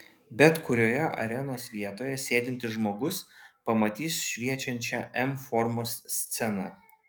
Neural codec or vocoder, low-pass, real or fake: codec, 44.1 kHz, 7.8 kbps, DAC; 19.8 kHz; fake